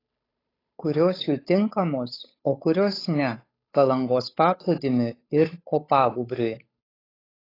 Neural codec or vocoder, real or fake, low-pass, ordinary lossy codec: codec, 16 kHz, 8 kbps, FunCodec, trained on Chinese and English, 25 frames a second; fake; 5.4 kHz; AAC, 24 kbps